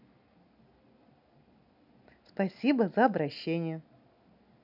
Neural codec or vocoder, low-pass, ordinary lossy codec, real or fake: none; 5.4 kHz; none; real